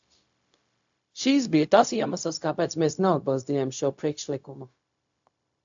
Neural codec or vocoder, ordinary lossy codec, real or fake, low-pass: codec, 16 kHz, 0.4 kbps, LongCat-Audio-Codec; AAC, 64 kbps; fake; 7.2 kHz